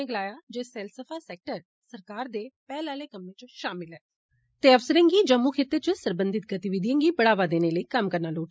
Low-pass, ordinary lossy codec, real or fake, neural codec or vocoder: none; none; real; none